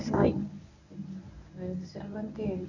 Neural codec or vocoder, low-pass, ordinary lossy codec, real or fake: codec, 24 kHz, 0.9 kbps, WavTokenizer, medium speech release version 1; 7.2 kHz; none; fake